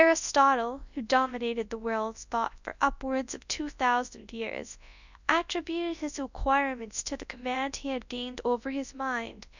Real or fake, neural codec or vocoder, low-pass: fake; codec, 24 kHz, 0.9 kbps, WavTokenizer, large speech release; 7.2 kHz